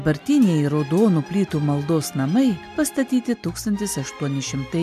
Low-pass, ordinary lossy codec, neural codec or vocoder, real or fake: 14.4 kHz; MP3, 96 kbps; none; real